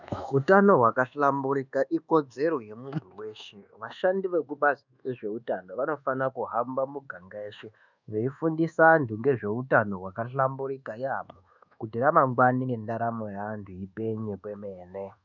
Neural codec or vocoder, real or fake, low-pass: codec, 24 kHz, 1.2 kbps, DualCodec; fake; 7.2 kHz